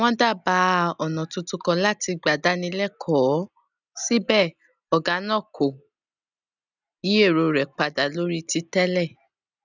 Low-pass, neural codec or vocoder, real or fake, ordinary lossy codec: 7.2 kHz; none; real; none